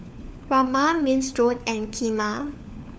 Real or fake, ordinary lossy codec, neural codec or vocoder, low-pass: fake; none; codec, 16 kHz, 4 kbps, FunCodec, trained on LibriTTS, 50 frames a second; none